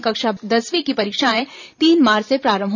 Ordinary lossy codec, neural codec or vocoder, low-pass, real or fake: none; vocoder, 44.1 kHz, 128 mel bands every 512 samples, BigVGAN v2; 7.2 kHz; fake